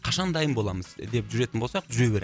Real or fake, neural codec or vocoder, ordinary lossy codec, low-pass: real; none; none; none